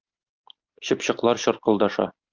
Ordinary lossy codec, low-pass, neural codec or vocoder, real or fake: Opus, 24 kbps; 7.2 kHz; none; real